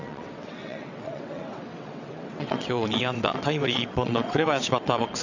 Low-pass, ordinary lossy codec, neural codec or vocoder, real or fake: 7.2 kHz; none; vocoder, 22.05 kHz, 80 mel bands, WaveNeXt; fake